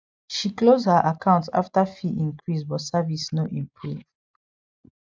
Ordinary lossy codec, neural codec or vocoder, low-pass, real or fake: none; none; none; real